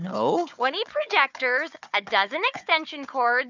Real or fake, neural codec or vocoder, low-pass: fake; codec, 16 kHz, 4 kbps, FunCodec, trained on Chinese and English, 50 frames a second; 7.2 kHz